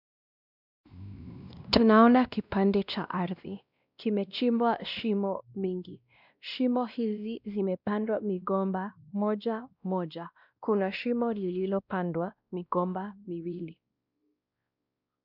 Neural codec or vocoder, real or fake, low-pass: codec, 16 kHz, 1 kbps, X-Codec, WavLM features, trained on Multilingual LibriSpeech; fake; 5.4 kHz